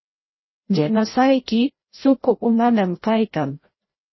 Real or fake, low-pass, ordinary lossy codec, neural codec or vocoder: fake; 7.2 kHz; MP3, 24 kbps; codec, 16 kHz, 0.5 kbps, FreqCodec, larger model